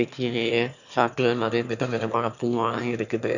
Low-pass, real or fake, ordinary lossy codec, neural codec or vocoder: 7.2 kHz; fake; none; autoencoder, 22.05 kHz, a latent of 192 numbers a frame, VITS, trained on one speaker